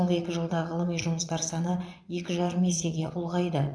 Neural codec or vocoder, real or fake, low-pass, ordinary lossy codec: vocoder, 22.05 kHz, 80 mel bands, Vocos; fake; none; none